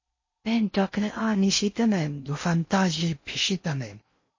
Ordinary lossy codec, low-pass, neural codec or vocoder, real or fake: MP3, 32 kbps; 7.2 kHz; codec, 16 kHz in and 24 kHz out, 0.6 kbps, FocalCodec, streaming, 4096 codes; fake